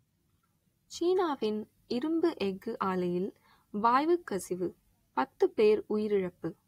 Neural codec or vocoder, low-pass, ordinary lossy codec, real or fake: none; 19.8 kHz; AAC, 48 kbps; real